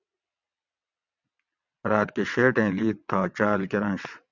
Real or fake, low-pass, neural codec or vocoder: fake; 7.2 kHz; vocoder, 22.05 kHz, 80 mel bands, WaveNeXt